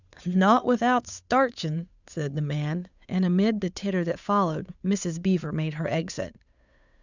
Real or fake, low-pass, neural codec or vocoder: fake; 7.2 kHz; codec, 16 kHz, 8 kbps, FunCodec, trained on Chinese and English, 25 frames a second